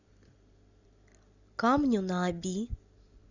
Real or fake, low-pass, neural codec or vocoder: real; 7.2 kHz; none